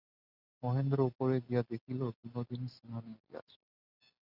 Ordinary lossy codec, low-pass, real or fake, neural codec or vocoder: Opus, 64 kbps; 5.4 kHz; real; none